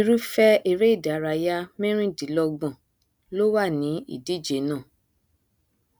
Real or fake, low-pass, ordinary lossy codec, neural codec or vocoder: real; none; none; none